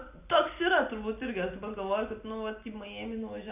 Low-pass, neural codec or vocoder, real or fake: 3.6 kHz; none; real